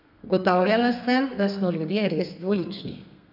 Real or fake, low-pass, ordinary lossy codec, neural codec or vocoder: fake; 5.4 kHz; none; codec, 32 kHz, 1.9 kbps, SNAC